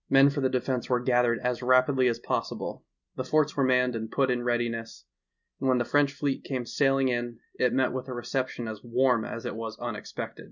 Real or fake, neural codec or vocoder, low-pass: real; none; 7.2 kHz